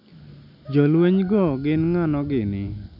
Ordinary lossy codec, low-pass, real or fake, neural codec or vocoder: none; 5.4 kHz; real; none